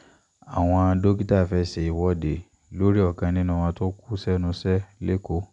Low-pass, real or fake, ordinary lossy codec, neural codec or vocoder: 10.8 kHz; real; none; none